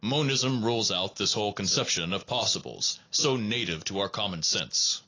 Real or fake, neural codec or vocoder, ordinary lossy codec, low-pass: real; none; AAC, 32 kbps; 7.2 kHz